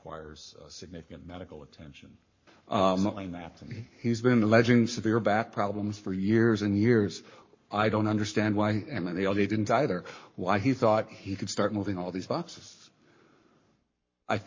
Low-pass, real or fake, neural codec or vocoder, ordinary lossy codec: 7.2 kHz; fake; codec, 44.1 kHz, 7.8 kbps, Pupu-Codec; MP3, 32 kbps